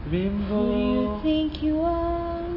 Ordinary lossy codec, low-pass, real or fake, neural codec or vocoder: MP3, 24 kbps; 5.4 kHz; real; none